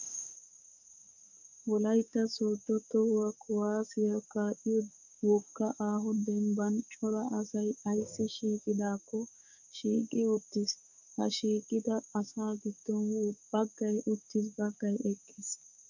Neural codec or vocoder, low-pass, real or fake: codec, 16 kHz, 6 kbps, DAC; 7.2 kHz; fake